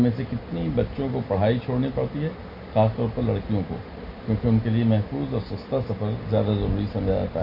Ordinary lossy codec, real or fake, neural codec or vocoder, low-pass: MP3, 32 kbps; real; none; 5.4 kHz